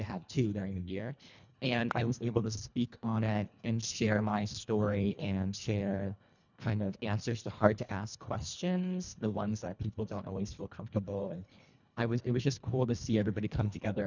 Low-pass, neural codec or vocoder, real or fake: 7.2 kHz; codec, 24 kHz, 1.5 kbps, HILCodec; fake